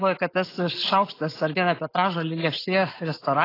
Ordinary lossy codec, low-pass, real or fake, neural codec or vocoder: AAC, 24 kbps; 5.4 kHz; real; none